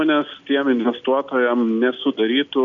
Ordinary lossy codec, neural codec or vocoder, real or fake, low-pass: MP3, 96 kbps; none; real; 7.2 kHz